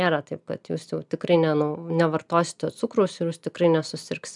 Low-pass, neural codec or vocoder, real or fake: 10.8 kHz; none; real